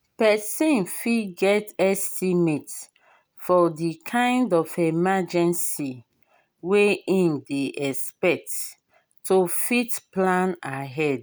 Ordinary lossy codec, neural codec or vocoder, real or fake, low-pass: none; none; real; none